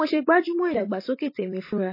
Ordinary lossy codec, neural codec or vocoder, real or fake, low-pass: MP3, 24 kbps; vocoder, 44.1 kHz, 128 mel bands every 256 samples, BigVGAN v2; fake; 5.4 kHz